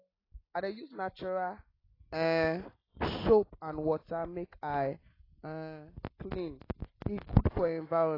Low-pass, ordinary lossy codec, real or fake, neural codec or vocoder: 5.4 kHz; AAC, 24 kbps; real; none